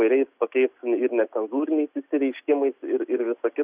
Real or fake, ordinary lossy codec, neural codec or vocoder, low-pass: real; Opus, 64 kbps; none; 3.6 kHz